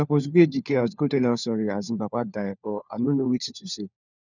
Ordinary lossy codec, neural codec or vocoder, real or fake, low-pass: none; codec, 16 kHz, 4 kbps, FunCodec, trained on LibriTTS, 50 frames a second; fake; 7.2 kHz